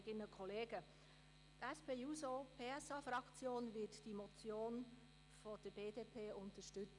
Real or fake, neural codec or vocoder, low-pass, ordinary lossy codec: real; none; 10.8 kHz; none